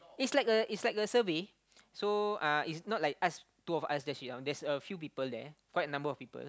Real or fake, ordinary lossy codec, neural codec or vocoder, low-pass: real; none; none; none